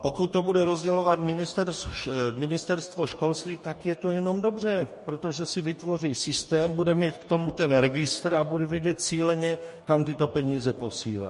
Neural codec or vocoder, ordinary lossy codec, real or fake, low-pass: codec, 44.1 kHz, 2.6 kbps, DAC; MP3, 48 kbps; fake; 14.4 kHz